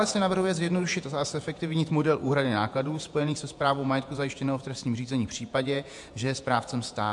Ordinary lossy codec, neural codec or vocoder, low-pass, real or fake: MP3, 64 kbps; vocoder, 48 kHz, 128 mel bands, Vocos; 10.8 kHz; fake